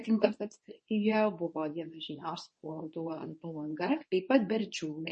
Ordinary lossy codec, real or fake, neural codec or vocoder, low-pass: MP3, 32 kbps; fake; codec, 24 kHz, 0.9 kbps, WavTokenizer, medium speech release version 2; 10.8 kHz